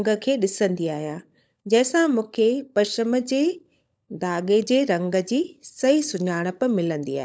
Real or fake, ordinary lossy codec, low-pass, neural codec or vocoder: fake; none; none; codec, 16 kHz, 16 kbps, FunCodec, trained on LibriTTS, 50 frames a second